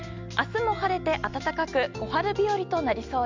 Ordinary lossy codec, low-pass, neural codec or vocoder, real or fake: MP3, 64 kbps; 7.2 kHz; none; real